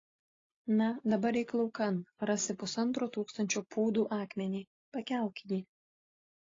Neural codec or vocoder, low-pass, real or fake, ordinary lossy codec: none; 7.2 kHz; real; AAC, 32 kbps